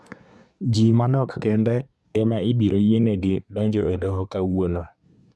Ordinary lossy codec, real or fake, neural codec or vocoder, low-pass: none; fake; codec, 24 kHz, 1 kbps, SNAC; none